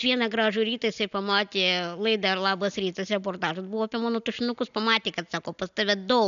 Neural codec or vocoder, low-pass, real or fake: none; 7.2 kHz; real